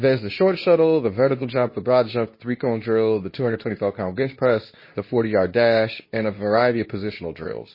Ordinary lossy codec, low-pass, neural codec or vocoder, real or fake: MP3, 24 kbps; 5.4 kHz; codec, 24 kHz, 0.9 kbps, WavTokenizer, medium speech release version 2; fake